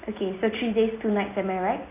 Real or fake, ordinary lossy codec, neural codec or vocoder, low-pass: real; AAC, 24 kbps; none; 3.6 kHz